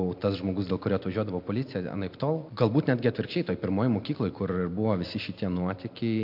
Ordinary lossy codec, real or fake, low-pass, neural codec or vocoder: MP3, 48 kbps; real; 5.4 kHz; none